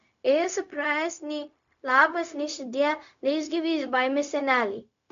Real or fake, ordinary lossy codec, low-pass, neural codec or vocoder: fake; AAC, 96 kbps; 7.2 kHz; codec, 16 kHz, 0.4 kbps, LongCat-Audio-Codec